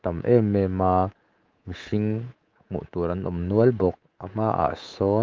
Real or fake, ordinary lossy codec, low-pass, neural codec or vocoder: fake; Opus, 24 kbps; 7.2 kHz; codec, 24 kHz, 3.1 kbps, DualCodec